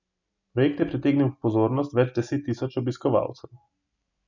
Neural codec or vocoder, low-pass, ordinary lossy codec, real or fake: none; 7.2 kHz; none; real